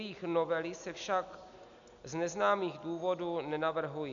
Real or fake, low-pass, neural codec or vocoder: real; 7.2 kHz; none